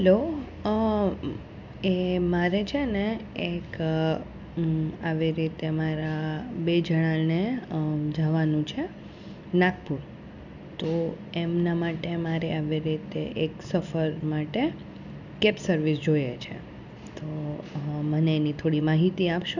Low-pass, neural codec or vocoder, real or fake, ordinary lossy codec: 7.2 kHz; none; real; none